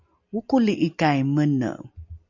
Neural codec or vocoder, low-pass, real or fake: none; 7.2 kHz; real